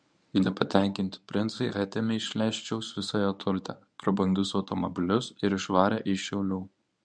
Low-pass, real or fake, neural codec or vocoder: 9.9 kHz; fake; codec, 24 kHz, 0.9 kbps, WavTokenizer, medium speech release version 1